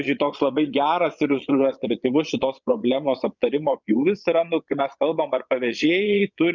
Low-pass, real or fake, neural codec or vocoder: 7.2 kHz; fake; vocoder, 22.05 kHz, 80 mel bands, Vocos